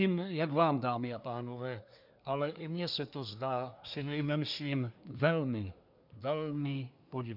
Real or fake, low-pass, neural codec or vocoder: fake; 5.4 kHz; codec, 24 kHz, 1 kbps, SNAC